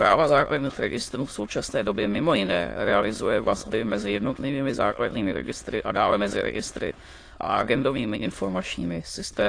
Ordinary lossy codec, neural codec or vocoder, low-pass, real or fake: AAC, 48 kbps; autoencoder, 22.05 kHz, a latent of 192 numbers a frame, VITS, trained on many speakers; 9.9 kHz; fake